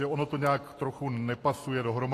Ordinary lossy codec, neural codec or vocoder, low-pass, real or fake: AAC, 48 kbps; none; 14.4 kHz; real